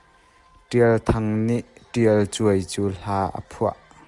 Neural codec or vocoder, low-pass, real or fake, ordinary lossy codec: none; 10.8 kHz; real; Opus, 32 kbps